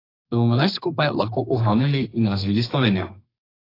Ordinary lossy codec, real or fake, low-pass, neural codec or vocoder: AAC, 24 kbps; fake; 5.4 kHz; codec, 32 kHz, 1.9 kbps, SNAC